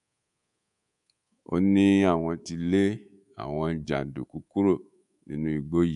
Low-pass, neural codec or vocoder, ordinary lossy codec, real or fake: 10.8 kHz; codec, 24 kHz, 3.1 kbps, DualCodec; MP3, 96 kbps; fake